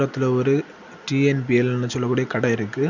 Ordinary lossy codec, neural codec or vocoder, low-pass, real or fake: none; none; 7.2 kHz; real